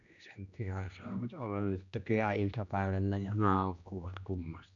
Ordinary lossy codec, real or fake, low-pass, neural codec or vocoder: none; fake; 7.2 kHz; codec, 16 kHz, 1 kbps, X-Codec, HuBERT features, trained on general audio